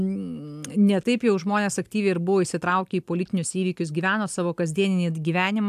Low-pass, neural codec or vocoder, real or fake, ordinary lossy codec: 14.4 kHz; none; real; AAC, 96 kbps